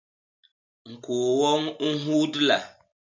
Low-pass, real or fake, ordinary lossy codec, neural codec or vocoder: 7.2 kHz; real; MP3, 64 kbps; none